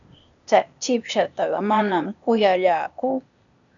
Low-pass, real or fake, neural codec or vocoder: 7.2 kHz; fake; codec, 16 kHz, 0.8 kbps, ZipCodec